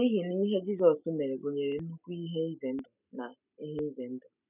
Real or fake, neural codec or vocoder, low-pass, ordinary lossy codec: real; none; 3.6 kHz; none